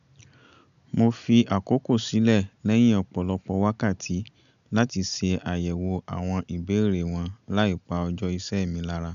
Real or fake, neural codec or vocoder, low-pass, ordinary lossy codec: real; none; 7.2 kHz; none